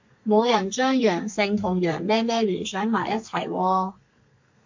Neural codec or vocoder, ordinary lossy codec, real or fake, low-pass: codec, 32 kHz, 1.9 kbps, SNAC; MP3, 48 kbps; fake; 7.2 kHz